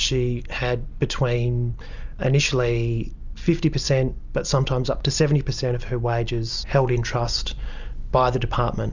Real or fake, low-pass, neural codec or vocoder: real; 7.2 kHz; none